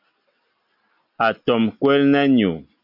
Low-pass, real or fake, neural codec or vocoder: 5.4 kHz; real; none